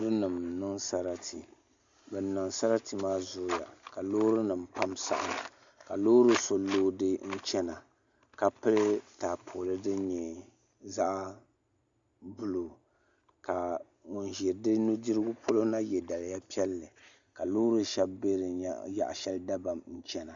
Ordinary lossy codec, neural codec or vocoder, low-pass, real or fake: Opus, 64 kbps; none; 7.2 kHz; real